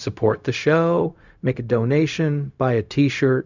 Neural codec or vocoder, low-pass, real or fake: codec, 16 kHz, 0.4 kbps, LongCat-Audio-Codec; 7.2 kHz; fake